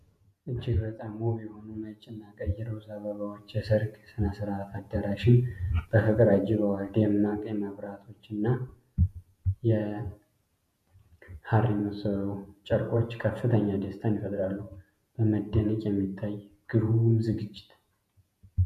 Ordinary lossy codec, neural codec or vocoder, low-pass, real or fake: MP3, 96 kbps; vocoder, 48 kHz, 128 mel bands, Vocos; 14.4 kHz; fake